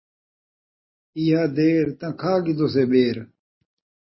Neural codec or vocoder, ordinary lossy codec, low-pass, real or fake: none; MP3, 24 kbps; 7.2 kHz; real